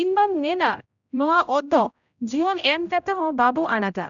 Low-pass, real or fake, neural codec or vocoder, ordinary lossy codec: 7.2 kHz; fake; codec, 16 kHz, 0.5 kbps, X-Codec, HuBERT features, trained on general audio; none